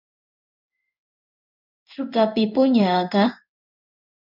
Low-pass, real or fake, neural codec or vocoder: 5.4 kHz; fake; codec, 16 kHz in and 24 kHz out, 1 kbps, XY-Tokenizer